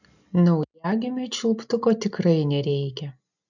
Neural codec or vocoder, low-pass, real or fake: none; 7.2 kHz; real